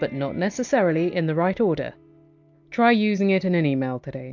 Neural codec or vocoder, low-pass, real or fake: none; 7.2 kHz; real